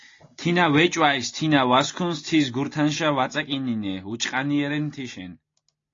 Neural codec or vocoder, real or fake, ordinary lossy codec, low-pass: none; real; AAC, 32 kbps; 7.2 kHz